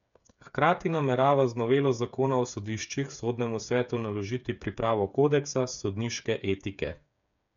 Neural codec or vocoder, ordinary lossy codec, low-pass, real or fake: codec, 16 kHz, 8 kbps, FreqCodec, smaller model; none; 7.2 kHz; fake